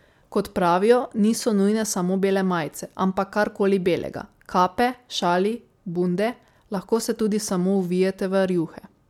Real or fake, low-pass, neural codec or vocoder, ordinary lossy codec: real; 19.8 kHz; none; MP3, 96 kbps